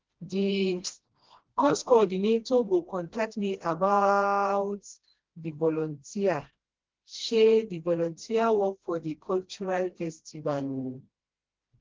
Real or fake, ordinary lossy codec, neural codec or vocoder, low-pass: fake; Opus, 16 kbps; codec, 16 kHz, 1 kbps, FreqCodec, smaller model; 7.2 kHz